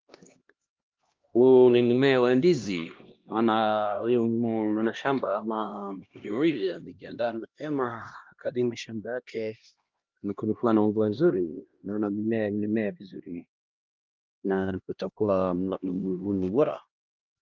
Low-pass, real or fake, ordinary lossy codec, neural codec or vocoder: 7.2 kHz; fake; Opus, 32 kbps; codec, 16 kHz, 1 kbps, X-Codec, HuBERT features, trained on LibriSpeech